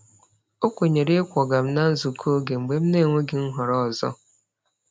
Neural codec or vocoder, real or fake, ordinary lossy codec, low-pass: none; real; none; none